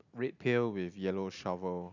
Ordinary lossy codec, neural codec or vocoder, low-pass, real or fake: none; none; 7.2 kHz; real